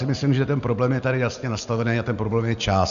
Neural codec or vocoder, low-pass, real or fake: none; 7.2 kHz; real